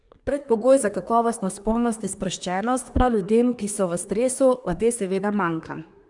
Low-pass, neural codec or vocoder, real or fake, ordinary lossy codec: 10.8 kHz; codec, 24 kHz, 1 kbps, SNAC; fake; none